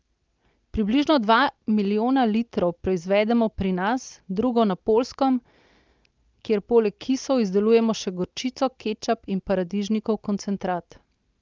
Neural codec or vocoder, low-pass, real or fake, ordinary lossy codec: none; 7.2 kHz; real; Opus, 24 kbps